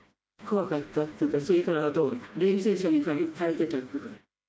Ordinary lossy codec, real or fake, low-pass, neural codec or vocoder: none; fake; none; codec, 16 kHz, 1 kbps, FreqCodec, smaller model